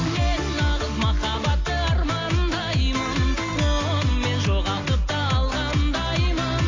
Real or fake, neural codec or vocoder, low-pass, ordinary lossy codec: real; none; 7.2 kHz; AAC, 32 kbps